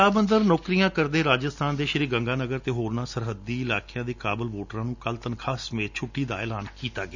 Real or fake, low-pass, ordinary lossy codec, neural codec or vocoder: real; 7.2 kHz; none; none